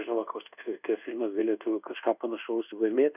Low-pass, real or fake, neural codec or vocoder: 3.6 kHz; fake; codec, 24 kHz, 1.2 kbps, DualCodec